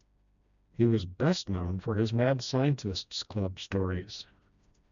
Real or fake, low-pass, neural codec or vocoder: fake; 7.2 kHz; codec, 16 kHz, 1 kbps, FreqCodec, smaller model